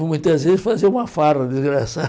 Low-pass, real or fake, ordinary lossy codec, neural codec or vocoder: none; real; none; none